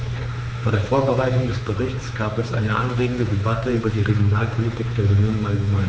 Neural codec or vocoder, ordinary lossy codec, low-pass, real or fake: codec, 16 kHz, 4 kbps, X-Codec, HuBERT features, trained on general audio; none; none; fake